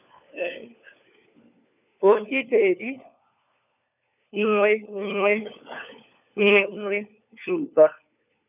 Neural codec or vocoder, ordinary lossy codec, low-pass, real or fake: codec, 16 kHz, 4 kbps, FunCodec, trained on LibriTTS, 50 frames a second; none; 3.6 kHz; fake